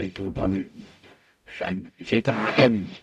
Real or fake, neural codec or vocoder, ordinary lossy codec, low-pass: fake; codec, 44.1 kHz, 0.9 kbps, DAC; none; 14.4 kHz